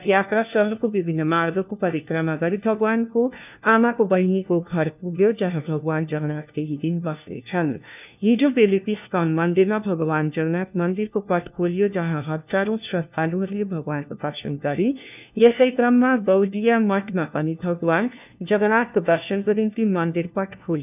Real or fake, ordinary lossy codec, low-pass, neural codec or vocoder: fake; none; 3.6 kHz; codec, 16 kHz, 1 kbps, FunCodec, trained on LibriTTS, 50 frames a second